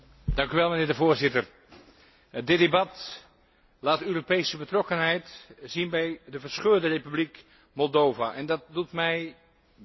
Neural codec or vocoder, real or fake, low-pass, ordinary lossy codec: none; real; 7.2 kHz; MP3, 24 kbps